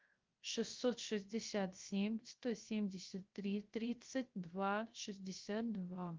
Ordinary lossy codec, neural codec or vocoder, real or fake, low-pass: Opus, 32 kbps; codec, 16 kHz, 0.3 kbps, FocalCodec; fake; 7.2 kHz